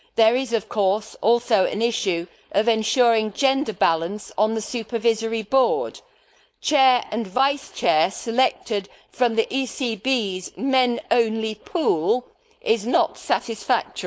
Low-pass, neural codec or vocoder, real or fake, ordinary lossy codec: none; codec, 16 kHz, 4.8 kbps, FACodec; fake; none